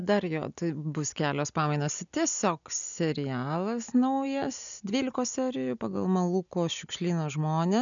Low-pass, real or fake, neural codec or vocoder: 7.2 kHz; real; none